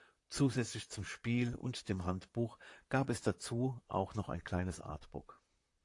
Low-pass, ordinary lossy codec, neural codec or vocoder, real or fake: 10.8 kHz; AAC, 48 kbps; vocoder, 44.1 kHz, 128 mel bands, Pupu-Vocoder; fake